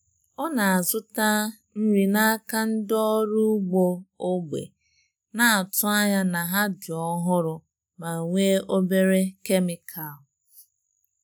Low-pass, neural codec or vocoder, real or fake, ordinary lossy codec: none; none; real; none